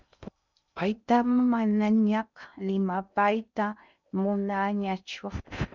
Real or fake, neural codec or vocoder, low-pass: fake; codec, 16 kHz in and 24 kHz out, 0.6 kbps, FocalCodec, streaming, 2048 codes; 7.2 kHz